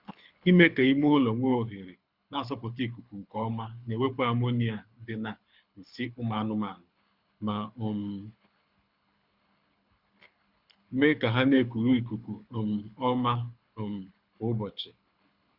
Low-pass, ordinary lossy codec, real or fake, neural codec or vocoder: 5.4 kHz; Opus, 64 kbps; fake; codec, 24 kHz, 6 kbps, HILCodec